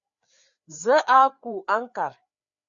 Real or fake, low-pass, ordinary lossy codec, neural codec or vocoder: fake; 7.2 kHz; Opus, 64 kbps; codec, 16 kHz, 4 kbps, FreqCodec, larger model